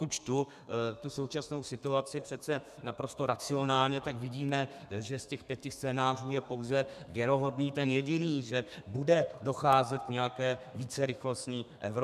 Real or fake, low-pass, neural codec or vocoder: fake; 14.4 kHz; codec, 32 kHz, 1.9 kbps, SNAC